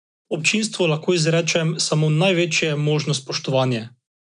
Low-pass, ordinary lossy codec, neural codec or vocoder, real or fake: 9.9 kHz; none; none; real